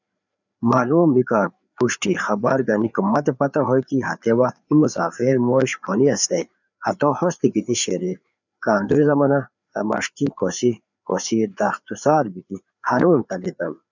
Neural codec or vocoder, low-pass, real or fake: codec, 16 kHz, 4 kbps, FreqCodec, larger model; 7.2 kHz; fake